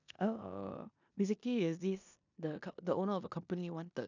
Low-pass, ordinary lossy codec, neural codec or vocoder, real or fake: 7.2 kHz; none; codec, 16 kHz in and 24 kHz out, 0.9 kbps, LongCat-Audio-Codec, four codebook decoder; fake